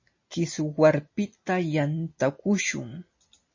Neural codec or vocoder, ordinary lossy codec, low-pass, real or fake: none; MP3, 32 kbps; 7.2 kHz; real